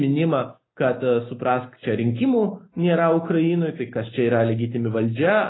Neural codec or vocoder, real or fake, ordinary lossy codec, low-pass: none; real; AAC, 16 kbps; 7.2 kHz